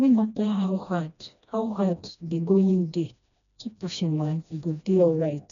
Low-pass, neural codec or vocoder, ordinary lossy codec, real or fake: 7.2 kHz; codec, 16 kHz, 1 kbps, FreqCodec, smaller model; none; fake